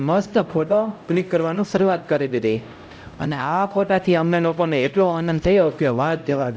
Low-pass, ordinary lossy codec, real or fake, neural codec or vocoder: none; none; fake; codec, 16 kHz, 0.5 kbps, X-Codec, HuBERT features, trained on LibriSpeech